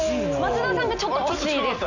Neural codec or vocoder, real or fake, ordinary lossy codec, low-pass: none; real; Opus, 64 kbps; 7.2 kHz